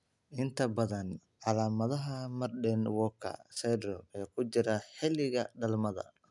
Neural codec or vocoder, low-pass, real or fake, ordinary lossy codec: none; 10.8 kHz; real; none